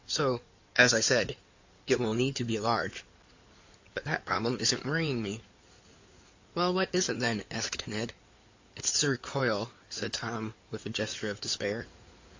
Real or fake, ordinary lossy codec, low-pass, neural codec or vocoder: fake; AAC, 48 kbps; 7.2 kHz; codec, 16 kHz in and 24 kHz out, 2.2 kbps, FireRedTTS-2 codec